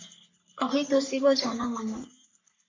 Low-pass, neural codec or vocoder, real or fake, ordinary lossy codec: 7.2 kHz; codec, 44.1 kHz, 3.4 kbps, Pupu-Codec; fake; MP3, 48 kbps